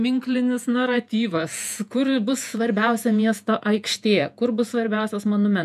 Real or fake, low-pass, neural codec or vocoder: fake; 14.4 kHz; vocoder, 48 kHz, 128 mel bands, Vocos